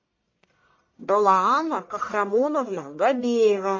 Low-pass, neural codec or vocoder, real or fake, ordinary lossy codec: 7.2 kHz; codec, 44.1 kHz, 1.7 kbps, Pupu-Codec; fake; MP3, 32 kbps